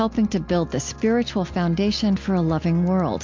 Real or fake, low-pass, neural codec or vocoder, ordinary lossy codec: real; 7.2 kHz; none; MP3, 48 kbps